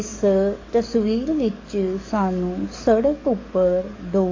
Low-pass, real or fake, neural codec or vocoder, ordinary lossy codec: 7.2 kHz; fake; codec, 44.1 kHz, 7.8 kbps, DAC; AAC, 32 kbps